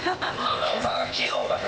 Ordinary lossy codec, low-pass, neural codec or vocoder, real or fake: none; none; codec, 16 kHz, 0.8 kbps, ZipCodec; fake